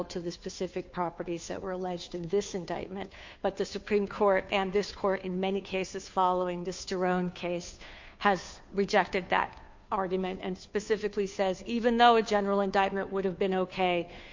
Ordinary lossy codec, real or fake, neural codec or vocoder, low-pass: MP3, 48 kbps; fake; codec, 16 kHz, 2 kbps, FunCodec, trained on Chinese and English, 25 frames a second; 7.2 kHz